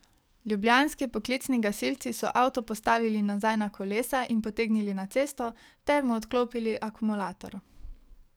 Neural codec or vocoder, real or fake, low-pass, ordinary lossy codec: codec, 44.1 kHz, 7.8 kbps, DAC; fake; none; none